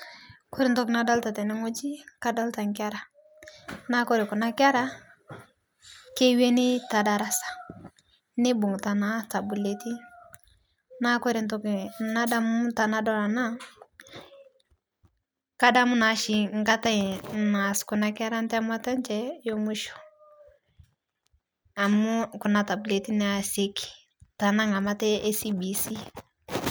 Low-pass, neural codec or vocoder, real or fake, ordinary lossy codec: none; none; real; none